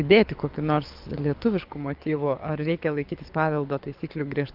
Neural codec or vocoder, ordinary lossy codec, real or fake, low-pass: codec, 24 kHz, 6 kbps, HILCodec; Opus, 24 kbps; fake; 5.4 kHz